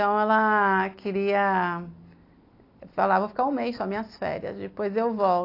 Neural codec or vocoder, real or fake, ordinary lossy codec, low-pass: none; real; AAC, 32 kbps; 5.4 kHz